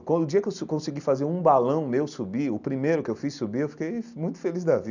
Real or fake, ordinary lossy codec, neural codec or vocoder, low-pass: real; none; none; 7.2 kHz